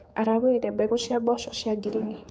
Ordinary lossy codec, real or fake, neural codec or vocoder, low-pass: none; fake; codec, 16 kHz, 4 kbps, X-Codec, HuBERT features, trained on general audio; none